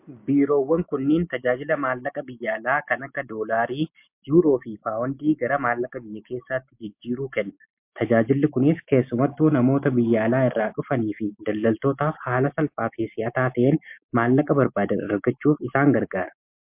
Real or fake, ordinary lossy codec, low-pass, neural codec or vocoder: real; MP3, 32 kbps; 3.6 kHz; none